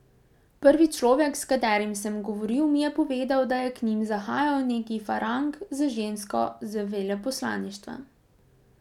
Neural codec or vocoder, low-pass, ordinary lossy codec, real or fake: none; 19.8 kHz; none; real